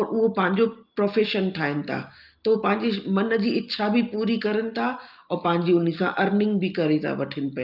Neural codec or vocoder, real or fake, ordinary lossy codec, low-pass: none; real; Opus, 24 kbps; 5.4 kHz